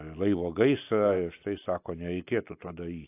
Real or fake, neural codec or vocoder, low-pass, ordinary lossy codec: real; none; 3.6 kHz; AAC, 24 kbps